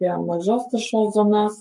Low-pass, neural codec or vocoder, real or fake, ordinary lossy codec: 10.8 kHz; codec, 44.1 kHz, 7.8 kbps, Pupu-Codec; fake; MP3, 48 kbps